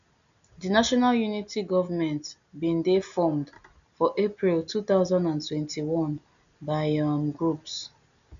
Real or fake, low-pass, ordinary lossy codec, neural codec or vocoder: real; 7.2 kHz; none; none